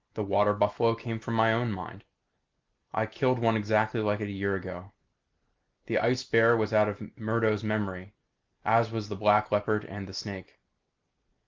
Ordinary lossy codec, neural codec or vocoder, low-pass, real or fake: Opus, 32 kbps; none; 7.2 kHz; real